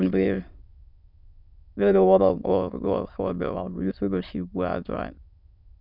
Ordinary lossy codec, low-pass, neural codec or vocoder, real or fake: none; 5.4 kHz; autoencoder, 22.05 kHz, a latent of 192 numbers a frame, VITS, trained on many speakers; fake